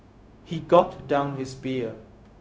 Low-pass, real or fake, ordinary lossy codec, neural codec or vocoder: none; fake; none; codec, 16 kHz, 0.4 kbps, LongCat-Audio-Codec